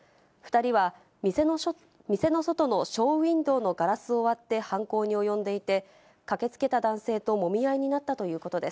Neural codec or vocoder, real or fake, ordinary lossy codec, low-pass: none; real; none; none